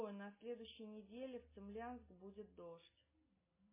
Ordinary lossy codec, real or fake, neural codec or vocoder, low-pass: MP3, 16 kbps; real; none; 3.6 kHz